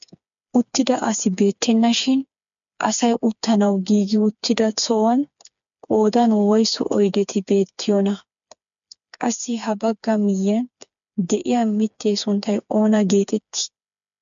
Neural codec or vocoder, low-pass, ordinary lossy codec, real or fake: codec, 16 kHz, 4 kbps, FreqCodec, smaller model; 7.2 kHz; MP3, 64 kbps; fake